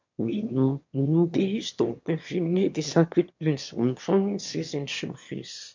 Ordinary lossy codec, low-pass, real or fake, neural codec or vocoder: MP3, 48 kbps; 7.2 kHz; fake; autoencoder, 22.05 kHz, a latent of 192 numbers a frame, VITS, trained on one speaker